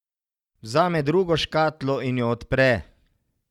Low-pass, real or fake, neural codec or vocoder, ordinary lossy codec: 19.8 kHz; real; none; Opus, 64 kbps